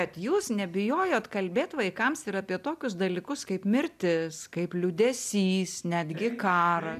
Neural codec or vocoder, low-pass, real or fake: none; 14.4 kHz; real